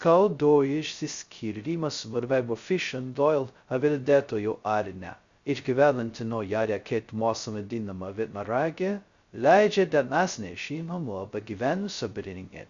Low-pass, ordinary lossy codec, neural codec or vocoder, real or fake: 7.2 kHz; Opus, 64 kbps; codec, 16 kHz, 0.2 kbps, FocalCodec; fake